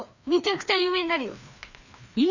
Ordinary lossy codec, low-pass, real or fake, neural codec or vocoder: none; 7.2 kHz; fake; codec, 16 kHz, 2 kbps, FreqCodec, larger model